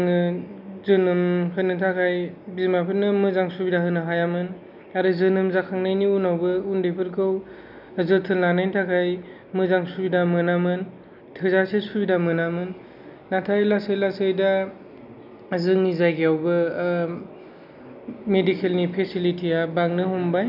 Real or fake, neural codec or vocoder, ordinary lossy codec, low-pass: real; none; none; 5.4 kHz